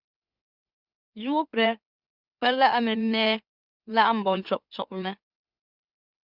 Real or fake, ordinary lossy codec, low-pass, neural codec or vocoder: fake; Opus, 64 kbps; 5.4 kHz; autoencoder, 44.1 kHz, a latent of 192 numbers a frame, MeloTTS